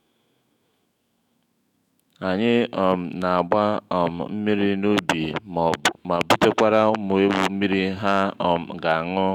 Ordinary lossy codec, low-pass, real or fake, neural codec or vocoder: none; 19.8 kHz; fake; autoencoder, 48 kHz, 128 numbers a frame, DAC-VAE, trained on Japanese speech